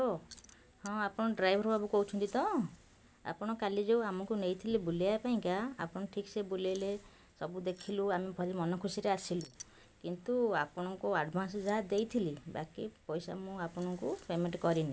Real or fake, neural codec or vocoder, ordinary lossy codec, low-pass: real; none; none; none